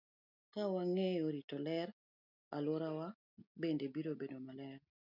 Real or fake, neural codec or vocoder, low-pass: real; none; 5.4 kHz